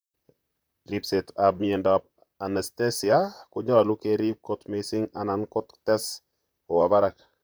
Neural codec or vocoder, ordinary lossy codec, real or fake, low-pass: vocoder, 44.1 kHz, 128 mel bands, Pupu-Vocoder; none; fake; none